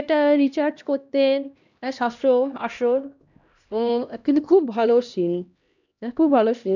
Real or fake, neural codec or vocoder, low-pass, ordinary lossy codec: fake; codec, 16 kHz, 1 kbps, X-Codec, HuBERT features, trained on LibriSpeech; 7.2 kHz; none